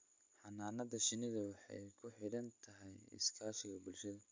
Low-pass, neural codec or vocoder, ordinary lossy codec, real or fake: 7.2 kHz; none; MP3, 64 kbps; real